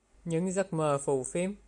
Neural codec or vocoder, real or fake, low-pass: none; real; 10.8 kHz